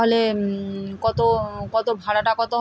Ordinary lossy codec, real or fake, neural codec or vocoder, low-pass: none; real; none; none